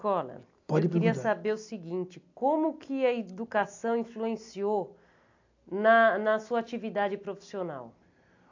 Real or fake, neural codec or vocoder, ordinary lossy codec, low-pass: real; none; AAC, 48 kbps; 7.2 kHz